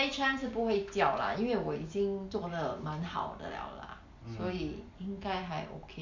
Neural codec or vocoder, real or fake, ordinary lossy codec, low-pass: none; real; MP3, 64 kbps; 7.2 kHz